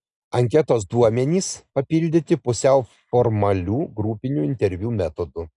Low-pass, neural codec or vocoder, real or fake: 10.8 kHz; none; real